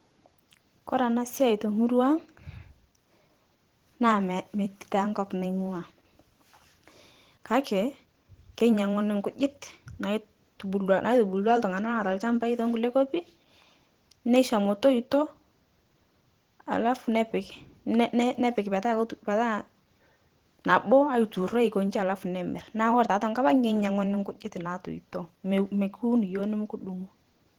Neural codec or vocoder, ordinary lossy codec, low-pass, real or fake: vocoder, 44.1 kHz, 128 mel bands every 512 samples, BigVGAN v2; Opus, 16 kbps; 19.8 kHz; fake